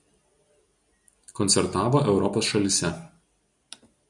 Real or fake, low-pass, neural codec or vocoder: real; 10.8 kHz; none